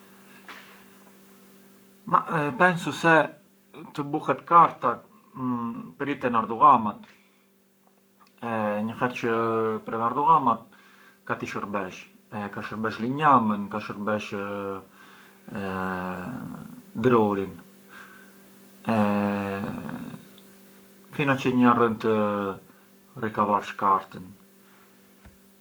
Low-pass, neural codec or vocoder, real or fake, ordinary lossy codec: none; codec, 44.1 kHz, 7.8 kbps, Pupu-Codec; fake; none